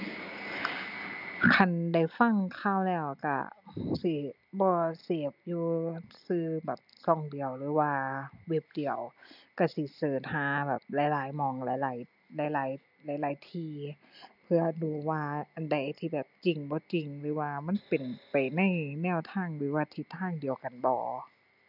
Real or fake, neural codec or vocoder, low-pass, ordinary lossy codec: real; none; 5.4 kHz; none